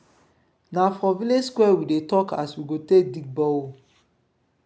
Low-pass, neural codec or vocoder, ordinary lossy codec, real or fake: none; none; none; real